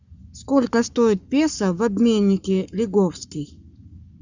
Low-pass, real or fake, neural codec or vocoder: 7.2 kHz; fake; codec, 44.1 kHz, 7.8 kbps, Pupu-Codec